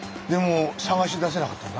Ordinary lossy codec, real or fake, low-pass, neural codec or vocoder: none; real; none; none